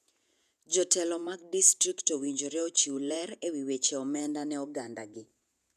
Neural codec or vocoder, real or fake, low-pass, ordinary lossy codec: vocoder, 48 kHz, 128 mel bands, Vocos; fake; 14.4 kHz; none